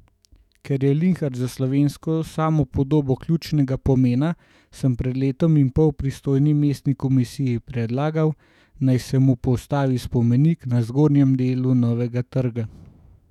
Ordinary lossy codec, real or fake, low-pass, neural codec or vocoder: none; fake; 19.8 kHz; autoencoder, 48 kHz, 128 numbers a frame, DAC-VAE, trained on Japanese speech